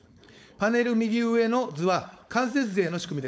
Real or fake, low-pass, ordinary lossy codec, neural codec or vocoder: fake; none; none; codec, 16 kHz, 4.8 kbps, FACodec